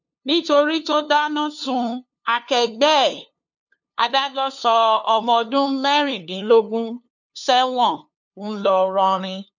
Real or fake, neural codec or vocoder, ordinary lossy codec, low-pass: fake; codec, 16 kHz, 2 kbps, FunCodec, trained on LibriTTS, 25 frames a second; none; 7.2 kHz